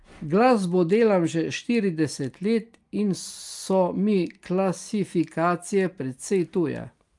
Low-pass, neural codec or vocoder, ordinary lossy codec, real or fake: 10.8 kHz; none; Opus, 32 kbps; real